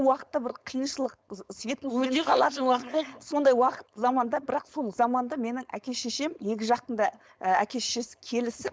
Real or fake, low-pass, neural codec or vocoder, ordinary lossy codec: fake; none; codec, 16 kHz, 4.8 kbps, FACodec; none